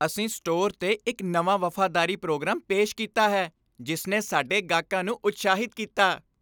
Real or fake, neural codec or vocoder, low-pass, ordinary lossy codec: real; none; none; none